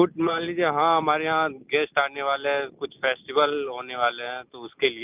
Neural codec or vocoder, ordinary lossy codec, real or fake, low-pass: none; Opus, 24 kbps; real; 3.6 kHz